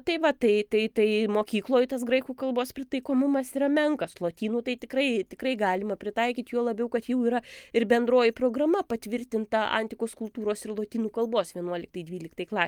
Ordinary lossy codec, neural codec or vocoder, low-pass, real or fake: Opus, 32 kbps; none; 19.8 kHz; real